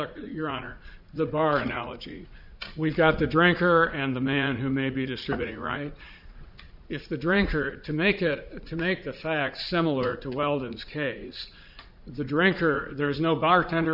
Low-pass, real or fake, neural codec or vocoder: 5.4 kHz; fake; vocoder, 44.1 kHz, 80 mel bands, Vocos